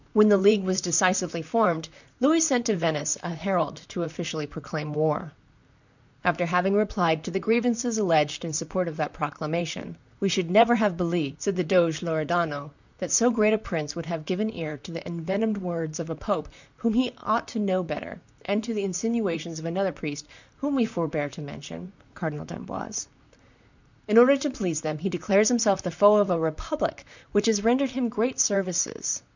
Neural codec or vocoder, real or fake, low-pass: vocoder, 44.1 kHz, 128 mel bands, Pupu-Vocoder; fake; 7.2 kHz